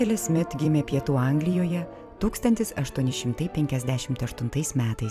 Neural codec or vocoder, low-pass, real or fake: vocoder, 44.1 kHz, 128 mel bands every 256 samples, BigVGAN v2; 14.4 kHz; fake